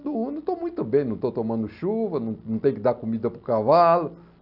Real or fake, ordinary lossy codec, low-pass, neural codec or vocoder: real; none; 5.4 kHz; none